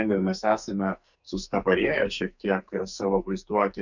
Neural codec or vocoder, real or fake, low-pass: codec, 44.1 kHz, 2.6 kbps, SNAC; fake; 7.2 kHz